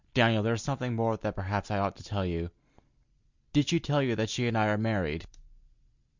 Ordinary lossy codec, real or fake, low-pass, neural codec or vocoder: Opus, 64 kbps; real; 7.2 kHz; none